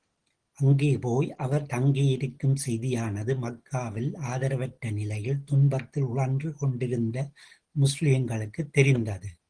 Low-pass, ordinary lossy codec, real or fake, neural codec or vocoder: 9.9 kHz; Opus, 16 kbps; real; none